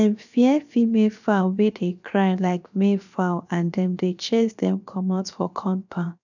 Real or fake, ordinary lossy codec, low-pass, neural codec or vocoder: fake; none; 7.2 kHz; codec, 16 kHz, 0.7 kbps, FocalCodec